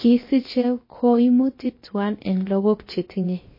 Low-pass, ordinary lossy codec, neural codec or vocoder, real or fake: 5.4 kHz; MP3, 24 kbps; codec, 16 kHz, about 1 kbps, DyCAST, with the encoder's durations; fake